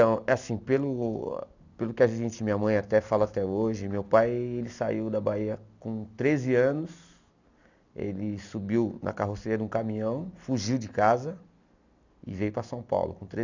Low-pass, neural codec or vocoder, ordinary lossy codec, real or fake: 7.2 kHz; none; none; real